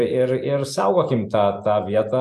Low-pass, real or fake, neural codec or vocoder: 14.4 kHz; real; none